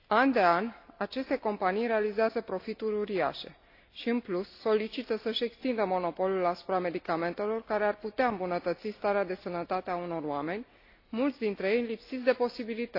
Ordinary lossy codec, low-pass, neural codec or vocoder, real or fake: AAC, 32 kbps; 5.4 kHz; none; real